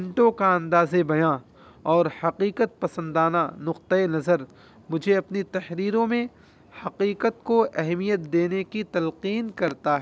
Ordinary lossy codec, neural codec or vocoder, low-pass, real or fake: none; none; none; real